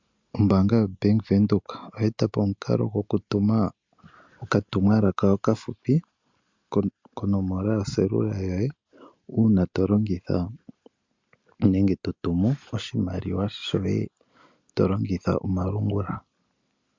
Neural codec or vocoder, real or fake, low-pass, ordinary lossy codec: none; real; 7.2 kHz; MP3, 64 kbps